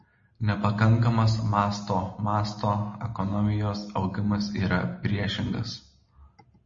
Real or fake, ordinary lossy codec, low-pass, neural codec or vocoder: real; MP3, 32 kbps; 7.2 kHz; none